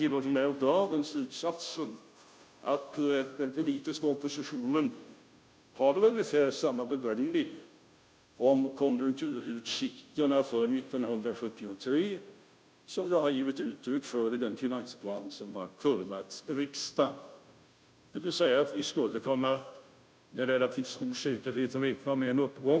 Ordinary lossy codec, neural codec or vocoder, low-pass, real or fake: none; codec, 16 kHz, 0.5 kbps, FunCodec, trained on Chinese and English, 25 frames a second; none; fake